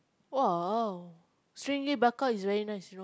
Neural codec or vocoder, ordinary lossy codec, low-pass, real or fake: none; none; none; real